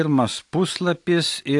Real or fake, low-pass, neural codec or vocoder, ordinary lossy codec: real; 10.8 kHz; none; AAC, 64 kbps